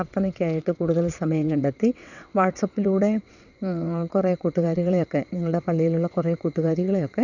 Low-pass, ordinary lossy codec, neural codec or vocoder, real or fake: 7.2 kHz; none; vocoder, 22.05 kHz, 80 mel bands, WaveNeXt; fake